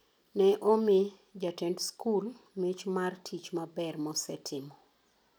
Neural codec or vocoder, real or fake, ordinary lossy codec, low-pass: none; real; none; none